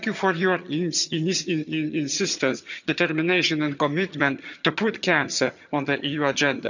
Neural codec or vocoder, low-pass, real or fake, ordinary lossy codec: vocoder, 22.05 kHz, 80 mel bands, HiFi-GAN; 7.2 kHz; fake; none